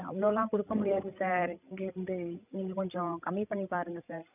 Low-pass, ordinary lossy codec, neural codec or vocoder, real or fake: 3.6 kHz; none; codec, 16 kHz, 16 kbps, FreqCodec, larger model; fake